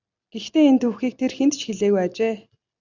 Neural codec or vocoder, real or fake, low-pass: none; real; 7.2 kHz